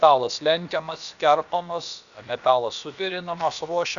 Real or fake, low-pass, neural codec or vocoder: fake; 7.2 kHz; codec, 16 kHz, about 1 kbps, DyCAST, with the encoder's durations